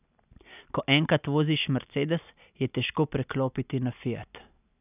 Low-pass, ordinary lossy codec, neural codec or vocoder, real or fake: 3.6 kHz; none; none; real